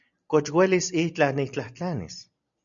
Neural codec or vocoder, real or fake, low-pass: none; real; 7.2 kHz